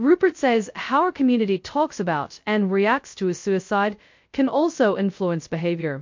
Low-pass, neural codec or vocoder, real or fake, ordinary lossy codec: 7.2 kHz; codec, 16 kHz, 0.2 kbps, FocalCodec; fake; MP3, 48 kbps